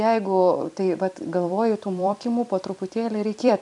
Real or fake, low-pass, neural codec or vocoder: fake; 10.8 kHz; vocoder, 44.1 kHz, 128 mel bands every 256 samples, BigVGAN v2